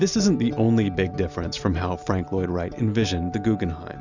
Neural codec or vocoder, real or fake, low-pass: none; real; 7.2 kHz